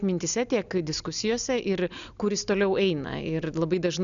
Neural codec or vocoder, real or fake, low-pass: none; real; 7.2 kHz